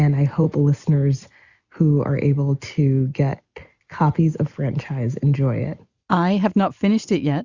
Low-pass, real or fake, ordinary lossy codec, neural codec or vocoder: 7.2 kHz; real; Opus, 64 kbps; none